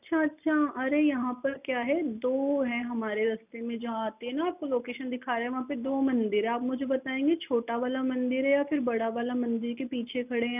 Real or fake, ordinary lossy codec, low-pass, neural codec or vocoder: real; none; 3.6 kHz; none